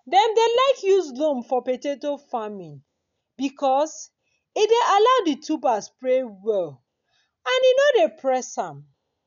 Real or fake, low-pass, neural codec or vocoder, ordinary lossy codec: real; 7.2 kHz; none; none